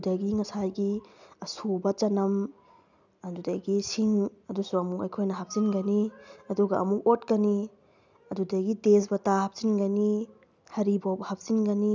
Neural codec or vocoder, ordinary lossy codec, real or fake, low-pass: none; none; real; 7.2 kHz